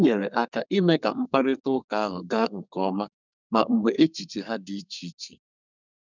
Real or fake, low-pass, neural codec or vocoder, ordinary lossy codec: fake; 7.2 kHz; codec, 32 kHz, 1.9 kbps, SNAC; none